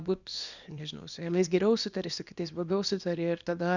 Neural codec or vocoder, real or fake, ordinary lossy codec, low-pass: codec, 24 kHz, 0.9 kbps, WavTokenizer, small release; fake; Opus, 64 kbps; 7.2 kHz